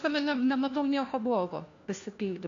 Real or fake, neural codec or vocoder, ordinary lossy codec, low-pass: fake; codec, 16 kHz, 1 kbps, FunCodec, trained on LibriTTS, 50 frames a second; AAC, 48 kbps; 7.2 kHz